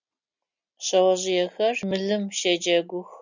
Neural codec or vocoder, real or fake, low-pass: none; real; 7.2 kHz